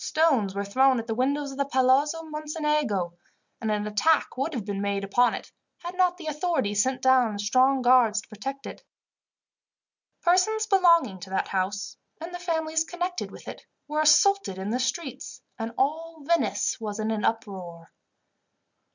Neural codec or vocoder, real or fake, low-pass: none; real; 7.2 kHz